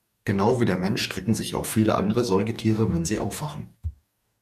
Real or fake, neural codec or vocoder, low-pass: fake; codec, 44.1 kHz, 2.6 kbps, DAC; 14.4 kHz